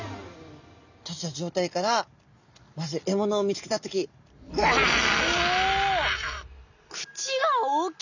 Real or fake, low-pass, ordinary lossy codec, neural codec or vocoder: real; 7.2 kHz; none; none